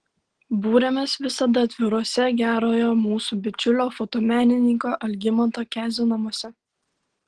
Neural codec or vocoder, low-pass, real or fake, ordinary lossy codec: none; 9.9 kHz; real; Opus, 16 kbps